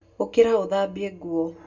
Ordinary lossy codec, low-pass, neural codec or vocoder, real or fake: none; 7.2 kHz; none; real